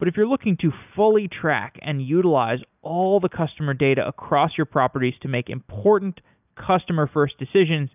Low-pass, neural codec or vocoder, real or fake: 3.6 kHz; none; real